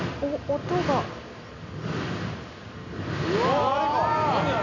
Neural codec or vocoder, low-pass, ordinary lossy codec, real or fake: none; 7.2 kHz; none; real